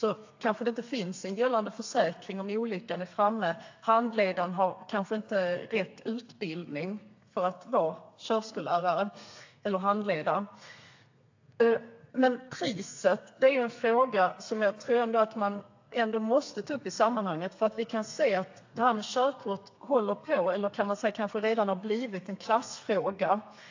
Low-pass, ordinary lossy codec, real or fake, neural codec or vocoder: 7.2 kHz; AAC, 48 kbps; fake; codec, 32 kHz, 1.9 kbps, SNAC